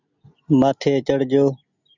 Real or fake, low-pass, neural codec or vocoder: real; 7.2 kHz; none